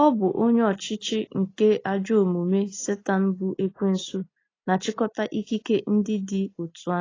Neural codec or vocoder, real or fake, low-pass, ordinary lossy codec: none; real; 7.2 kHz; AAC, 32 kbps